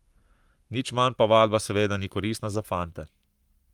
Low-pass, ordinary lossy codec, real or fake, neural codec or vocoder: 19.8 kHz; Opus, 32 kbps; fake; codec, 44.1 kHz, 7.8 kbps, Pupu-Codec